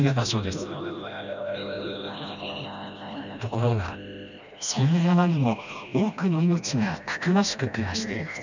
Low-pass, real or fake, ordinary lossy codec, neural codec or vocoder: 7.2 kHz; fake; none; codec, 16 kHz, 1 kbps, FreqCodec, smaller model